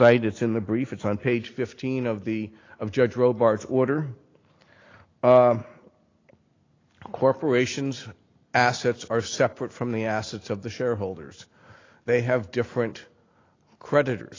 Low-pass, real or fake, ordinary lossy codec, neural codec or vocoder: 7.2 kHz; real; AAC, 32 kbps; none